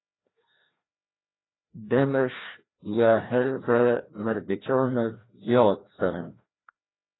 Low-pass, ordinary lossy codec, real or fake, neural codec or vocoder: 7.2 kHz; AAC, 16 kbps; fake; codec, 16 kHz, 1 kbps, FreqCodec, larger model